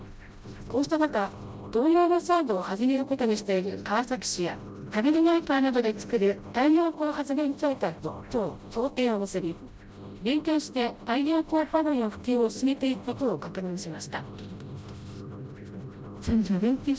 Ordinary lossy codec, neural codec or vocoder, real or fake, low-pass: none; codec, 16 kHz, 0.5 kbps, FreqCodec, smaller model; fake; none